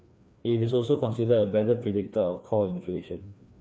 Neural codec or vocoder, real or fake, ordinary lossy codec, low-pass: codec, 16 kHz, 2 kbps, FreqCodec, larger model; fake; none; none